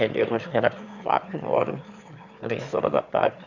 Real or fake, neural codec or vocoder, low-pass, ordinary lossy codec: fake; autoencoder, 22.05 kHz, a latent of 192 numbers a frame, VITS, trained on one speaker; 7.2 kHz; none